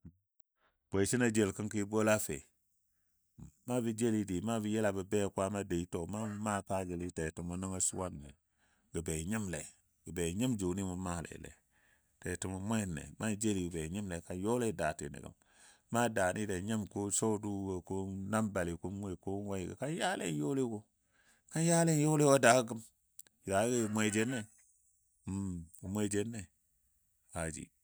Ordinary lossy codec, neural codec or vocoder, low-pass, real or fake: none; none; none; real